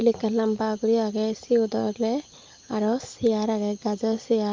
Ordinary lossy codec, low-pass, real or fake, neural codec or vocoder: Opus, 24 kbps; 7.2 kHz; real; none